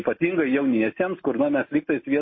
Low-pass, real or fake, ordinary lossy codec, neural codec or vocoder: 7.2 kHz; real; MP3, 24 kbps; none